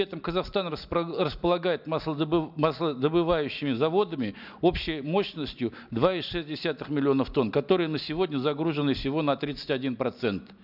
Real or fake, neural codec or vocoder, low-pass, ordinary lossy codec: real; none; 5.4 kHz; none